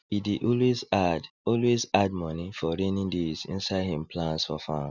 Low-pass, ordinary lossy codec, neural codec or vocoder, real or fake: 7.2 kHz; none; none; real